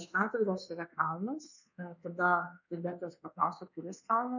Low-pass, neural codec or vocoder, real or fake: 7.2 kHz; codec, 24 kHz, 1.2 kbps, DualCodec; fake